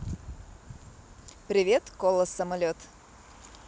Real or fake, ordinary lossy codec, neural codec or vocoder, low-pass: real; none; none; none